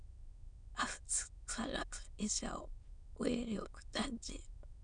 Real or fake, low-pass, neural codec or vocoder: fake; 9.9 kHz; autoencoder, 22.05 kHz, a latent of 192 numbers a frame, VITS, trained on many speakers